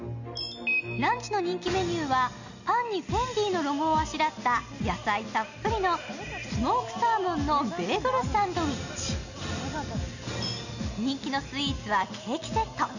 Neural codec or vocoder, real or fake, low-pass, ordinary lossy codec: none; real; 7.2 kHz; none